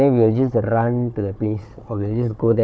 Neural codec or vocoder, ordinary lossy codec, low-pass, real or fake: codec, 16 kHz, 4 kbps, FunCodec, trained on Chinese and English, 50 frames a second; none; none; fake